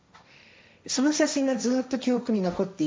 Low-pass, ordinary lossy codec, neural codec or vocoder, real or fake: none; none; codec, 16 kHz, 1.1 kbps, Voila-Tokenizer; fake